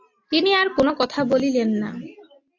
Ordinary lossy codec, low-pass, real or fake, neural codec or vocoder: AAC, 48 kbps; 7.2 kHz; real; none